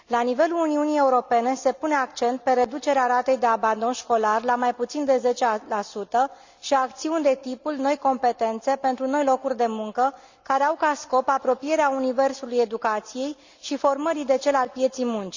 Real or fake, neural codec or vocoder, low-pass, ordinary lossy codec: real; none; 7.2 kHz; Opus, 64 kbps